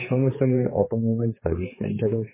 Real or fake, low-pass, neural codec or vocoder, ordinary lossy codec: fake; 3.6 kHz; codec, 16 kHz, 4 kbps, X-Codec, HuBERT features, trained on general audio; MP3, 16 kbps